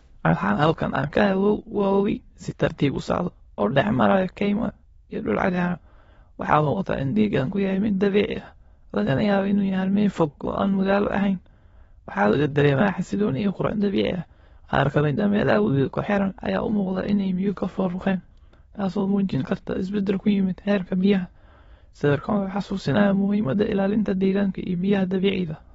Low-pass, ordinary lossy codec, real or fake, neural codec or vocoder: 9.9 kHz; AAC, 24 kbps; fake; autoencoder, 22.05 kHz, a latent of 192 numbers a frame, VITS, trained on many speakers